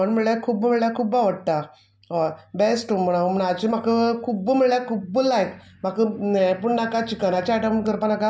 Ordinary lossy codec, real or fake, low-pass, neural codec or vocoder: none; real; none; none